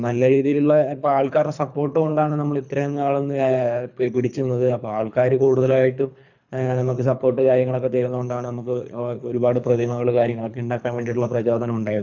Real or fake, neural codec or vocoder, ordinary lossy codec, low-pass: fake; codec, 24 kHz, 3 kbps, HILCodec; none; 7.2 kHz